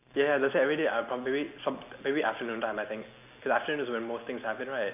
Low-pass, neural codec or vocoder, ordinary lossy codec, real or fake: 3.6 kHz; none; none; real